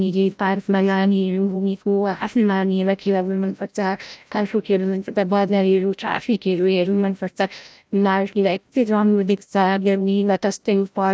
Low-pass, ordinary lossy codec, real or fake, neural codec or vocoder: none; none; fake; codec, 16 kHz, 0.5 kbps, FreqCodec, larger model